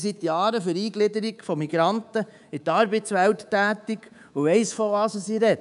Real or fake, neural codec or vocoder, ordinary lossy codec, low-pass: fake; codec, 24 kHz, 3.1 kbps, DualCodec; none; 10.8 kHz